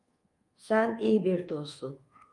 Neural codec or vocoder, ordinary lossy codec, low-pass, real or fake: codec, 24 kHz, 1.2 kbps, DualCodec; Opus, 32 kbps; 10.8 kHz; fake